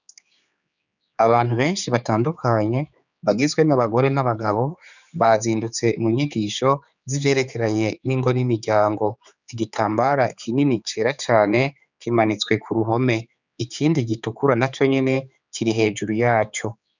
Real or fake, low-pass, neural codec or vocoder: fake; 7.2 kHz; codec, 16 kHz, 4 kbps, X-Codec, HuBERT features, trained on general audio